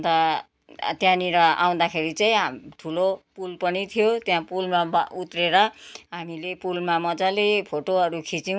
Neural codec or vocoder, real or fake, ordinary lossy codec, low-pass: none; real; none; none